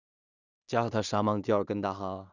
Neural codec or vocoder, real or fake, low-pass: codec, 16 kHz in and 24 kHz out, 0.4 kbps, LongCat-Audio-Codec, two codebook decoder; fake; 7.2 kHz